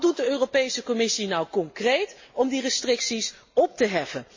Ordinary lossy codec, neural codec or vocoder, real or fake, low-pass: MP3, 32 kbps; none; real; 7.2 kHz